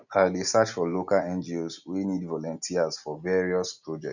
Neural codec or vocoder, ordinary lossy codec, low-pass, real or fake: none; none; 7.2 kHz; real